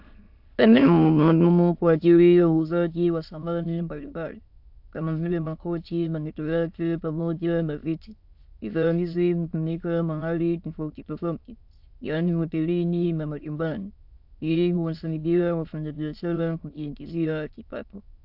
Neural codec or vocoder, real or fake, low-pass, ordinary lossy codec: autoencoder, 22.05 kHz, a latent of 192 numbers a frame, VITS, trained on many speakers; fake; 5.4 kHz; AAC, 48 kbps